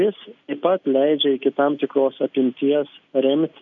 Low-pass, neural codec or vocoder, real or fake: 7.2 kHz; none; real